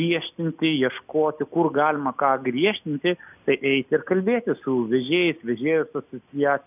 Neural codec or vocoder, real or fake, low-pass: none; real; 3.6 kHz